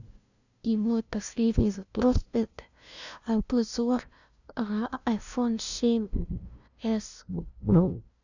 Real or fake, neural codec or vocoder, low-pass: fake; codec, 16 kHz, 0.5 kbps, FunCodec, trained on LibriTTS, 25 frames a second; 7.2 kHz